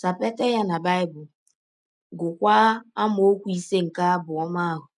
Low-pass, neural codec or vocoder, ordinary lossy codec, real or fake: 10.8 kHz; none; none; real